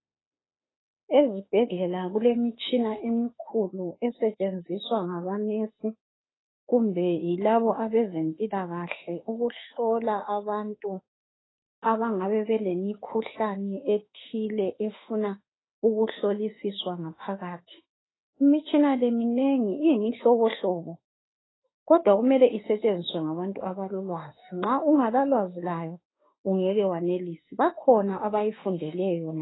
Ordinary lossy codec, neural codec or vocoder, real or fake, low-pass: AAC, 16 kbps; autoencoder, 48 kHz, 32 numbers a frame, DAC-VAE, trained on Japanese speech; fake; 7.2 kHz